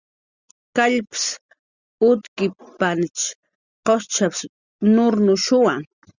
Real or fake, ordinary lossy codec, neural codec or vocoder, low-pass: real; Opus, 64 kbps; none; 7.2 kHz